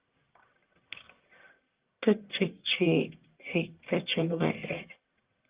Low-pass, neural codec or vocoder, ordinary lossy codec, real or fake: 3.6 kHz; codec, 44.1 kHz, 1.7 kbps, Pupu-Codec; Opus, 32 kbps; fake